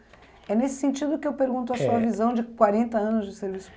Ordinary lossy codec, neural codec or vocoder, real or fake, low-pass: none; none; real; none